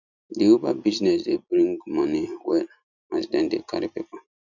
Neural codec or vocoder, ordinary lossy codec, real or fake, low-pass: none; none; real; 7.2 kHz